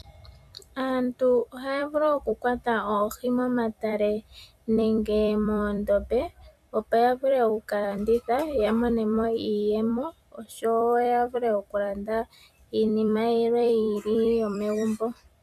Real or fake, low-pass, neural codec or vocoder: fake; 14.4 kHz; vocoder, 44.1 kHz, 128 mel bands every 256 samples, BigVGAN v2